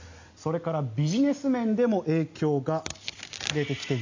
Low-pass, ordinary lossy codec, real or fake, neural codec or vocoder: 7.2 kHz; AAC, 48 kbps; real; none